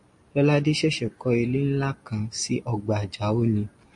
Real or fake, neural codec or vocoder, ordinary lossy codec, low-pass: real; none; MP3, 48 kbps; 10.8 kHz